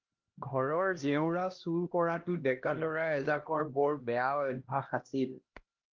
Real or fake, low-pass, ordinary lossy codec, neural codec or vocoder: fake; 7.2 kHz; Opus, 16 kbps; codec, 16 kHz, 1 kbps, X-Codec, HuBERT features, trained on LibriSpeech